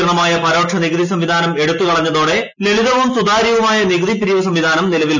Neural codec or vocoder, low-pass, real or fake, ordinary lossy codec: none; 7.2 kHz; real; none